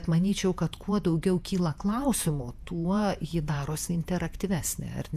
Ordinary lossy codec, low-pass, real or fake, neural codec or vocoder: AAC, 96 kbps; 14.4 kHz; fake; vocoder, 48 kHz, 128 mel bands, Vocos